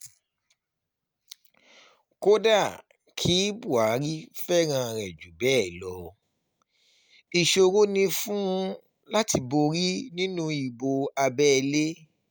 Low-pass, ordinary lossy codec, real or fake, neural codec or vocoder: none; none; real; none